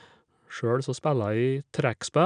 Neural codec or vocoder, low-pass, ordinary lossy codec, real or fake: none; 9.9 kHz; none; real